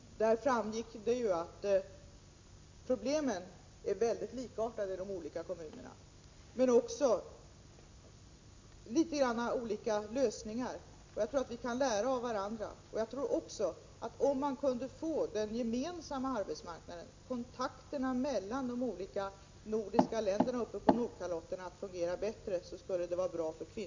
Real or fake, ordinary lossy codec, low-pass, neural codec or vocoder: real; MP3, 48 kbps; 7.2 kHz; none